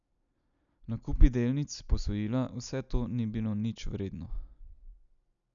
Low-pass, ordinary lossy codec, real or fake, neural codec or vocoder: 7.2 kHz; none; real; none